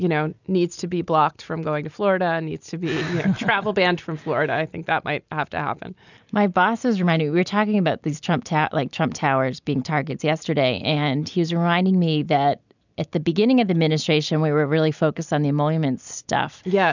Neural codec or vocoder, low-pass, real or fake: none; 7.2 kHz; real